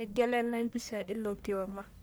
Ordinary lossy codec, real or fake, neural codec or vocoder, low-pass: none; fake; codec, 44.1 kHz, 1.7 kbps, Pupu-Codec; none